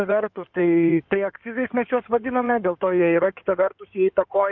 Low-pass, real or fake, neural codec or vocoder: 7.2 kHz; fake; codec, 16 kHz in and 24 kHz out, 2.2 kbps, FireRedTTS-2 codec